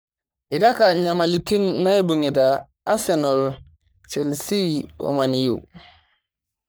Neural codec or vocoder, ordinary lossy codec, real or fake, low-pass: codec, 44.1 kHz, 3.4 kbps, Pupu-Codec; none; fake; none